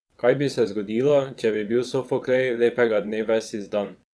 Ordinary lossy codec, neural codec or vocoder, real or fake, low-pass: none; vocoder, 22.05 kHz, 80 mel bands, WaveNeXt; fake; none